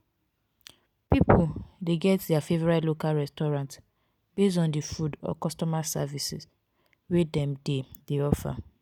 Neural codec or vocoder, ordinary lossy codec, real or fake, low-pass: none; none; real; 19.8 kHz